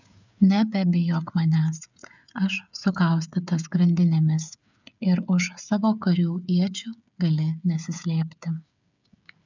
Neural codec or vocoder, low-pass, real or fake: codec, 16 kHz, 8 kbps, FreqCodec, smaller model; 7.2 kHz; fake